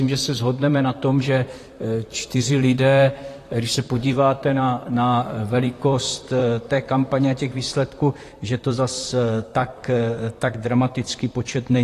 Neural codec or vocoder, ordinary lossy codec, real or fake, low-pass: vocoder, 44.1 kHz, 128 mel bands, Pupu-Vocoder; AAC, 48 kbps; fake; 14.4 kHz